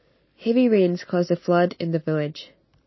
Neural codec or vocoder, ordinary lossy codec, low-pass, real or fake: none; MP3, 24 kbps; 7.2 kHz; real